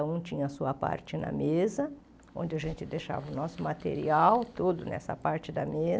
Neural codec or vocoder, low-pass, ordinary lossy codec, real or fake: none; none; none; real